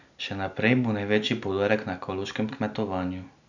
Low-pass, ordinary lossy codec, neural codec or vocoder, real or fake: 7.2 kHz; none; none; real